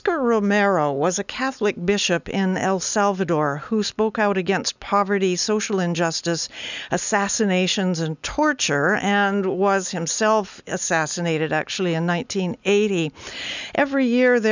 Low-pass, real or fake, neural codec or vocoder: 7.2 kHz; real; none